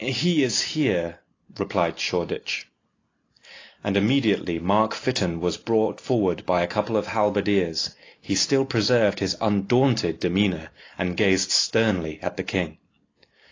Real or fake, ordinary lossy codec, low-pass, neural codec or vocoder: real; AAC, 32 kbps; 7.2 kHz; none